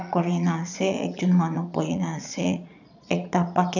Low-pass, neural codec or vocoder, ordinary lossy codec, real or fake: 7.2 kHz; vocoder, 22.05 kHz, 80 mel bands, Vocos; none; fake